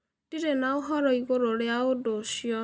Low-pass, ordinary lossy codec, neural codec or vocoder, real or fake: none; none; none; real